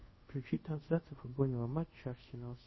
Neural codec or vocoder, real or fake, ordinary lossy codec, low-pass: codec, 24 kHz, 0.5 kbps, DualCodec; fake; MP3, 24 kbps; 7.2 kHz